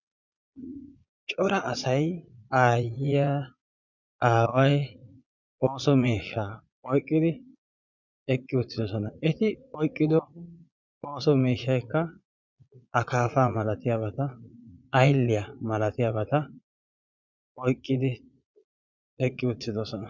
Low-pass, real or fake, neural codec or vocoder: 7.2 kHz; fake; vocoder, 22.05 kHz, 80 mel bands, Vocos